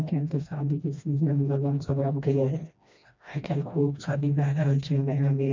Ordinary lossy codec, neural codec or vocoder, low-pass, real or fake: MP3, 64 kbps; codec, 16 kHz, 1 kbps, FreqCodec, smaller model; 7.2 kHz; fake